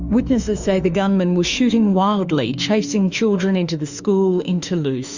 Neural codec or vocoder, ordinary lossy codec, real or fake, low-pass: autoencoder, 48 kHz, 32 numbers a frame, DAC-VAE, trained on Japanese speech; Opus, 64 kbps; fake; 7.2 kHz